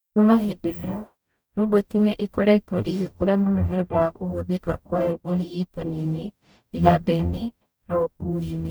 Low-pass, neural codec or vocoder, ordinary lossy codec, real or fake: none; codec, 44.1 kHz, 0.9 kbps, DAC; none; fake